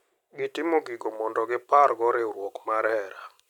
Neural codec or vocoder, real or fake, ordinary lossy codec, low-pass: none; real; none; 19.8 kHz